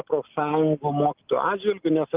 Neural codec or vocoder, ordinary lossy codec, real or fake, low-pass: none; Opus, 24 kbps; real; 3.6 kHz